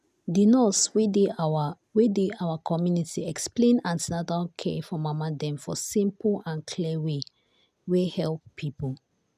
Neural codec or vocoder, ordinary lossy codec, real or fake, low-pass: none; none; real; 14.4 kHz